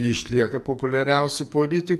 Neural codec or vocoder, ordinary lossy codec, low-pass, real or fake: codec, 44.1 kHz, 2.6 kbps, SNAC; AAC, 96 kbps; 14.4 kHz; fake